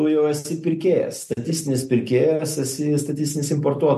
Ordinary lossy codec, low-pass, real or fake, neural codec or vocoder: MP3, 64 kbps; 14.4 kHz; real; none